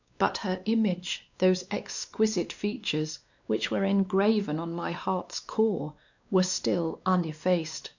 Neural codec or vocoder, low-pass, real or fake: codec, 16 kHz, 2 kbps, X-Codec, WavLM features, trained on Multilingual LibriSpeech; 7.2 kHz; fake